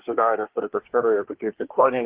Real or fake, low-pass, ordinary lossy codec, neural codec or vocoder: fake; 3.6 kHz; Opus, 16 kbps; codec, 24 kHz, 1 kbps, SNAC